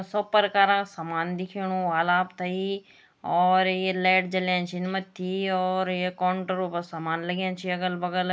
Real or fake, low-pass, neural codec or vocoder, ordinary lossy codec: real; none; none; none